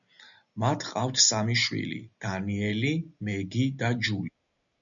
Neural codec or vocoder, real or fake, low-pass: none; real; 7.2 kHz